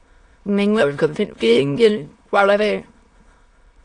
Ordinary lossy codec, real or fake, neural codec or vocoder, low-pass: AAC, 48 kbps; fake; autoencoder, 22.05 kHz, a latent of 192 numbers a frame, VITS, trained on many speakers; 9.9 kHz